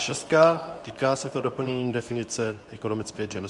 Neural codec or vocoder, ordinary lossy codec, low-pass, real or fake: codec, 24 kHz, 0.9 kbps, WavTokenizer, medium speech release version 2; MP3, 96 kbps; 10.8 kHz; fake